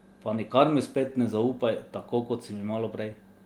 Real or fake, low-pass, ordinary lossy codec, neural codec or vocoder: real; 19.8 kHz; Opus, 24 kbps; none